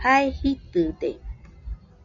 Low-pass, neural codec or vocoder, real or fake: 7.2 kHz; none; real